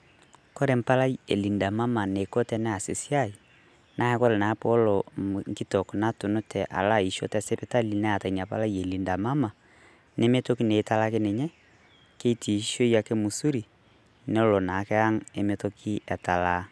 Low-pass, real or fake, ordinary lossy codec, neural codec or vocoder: none; real; none; none